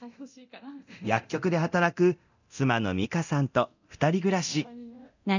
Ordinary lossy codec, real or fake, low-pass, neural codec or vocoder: none; fake; 7.2 kHz; codec, 24 kHz, 0.9 kbps, DualCodec